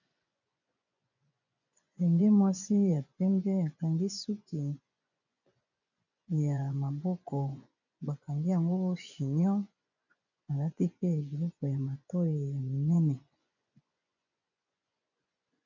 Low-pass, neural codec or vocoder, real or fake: 7.2 kHz; vocoder, 24 kHz, 100 mel bands, Vocos; fake